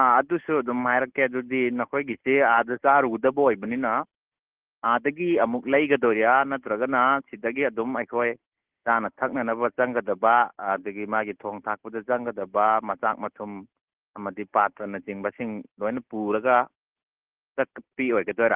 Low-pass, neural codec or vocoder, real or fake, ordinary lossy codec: 3.6 kHz; none; real; Opus, 16 kbps